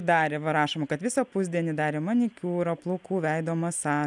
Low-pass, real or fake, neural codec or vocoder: 10.8 kHz; real; none